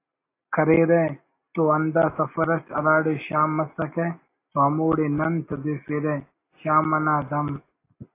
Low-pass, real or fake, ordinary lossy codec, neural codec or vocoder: 3.6 kHz; real; AAC, 24 kbps; none